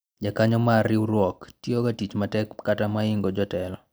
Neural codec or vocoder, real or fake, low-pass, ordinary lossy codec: none; real; none; none